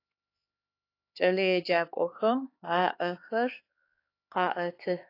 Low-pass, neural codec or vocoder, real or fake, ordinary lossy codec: 5.4 kHz; codec, 16 kHz, 4 kbps, X-Codec, HuBERT features, trained on LibriSpeech; fake; AAC, 32 kbps